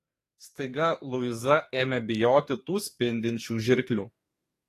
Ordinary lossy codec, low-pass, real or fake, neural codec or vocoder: AAC, 48 kbps; 14.4 kHz; fake; codec, 44.1 kHz, 2.6 kbps, SNAC